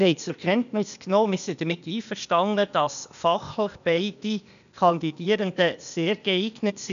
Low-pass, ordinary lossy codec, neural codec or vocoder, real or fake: 7.2 kHz; none; codec, 16 kHz, 0.8 kbps, ZipCodec; fake